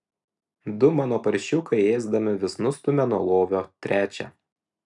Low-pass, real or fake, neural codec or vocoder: 10.8 kHz; real; none